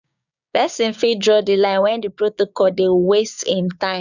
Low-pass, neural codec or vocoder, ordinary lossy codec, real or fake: 7.2 kHz; codec, 16 kHz, 6 kbps, DAC; none; fake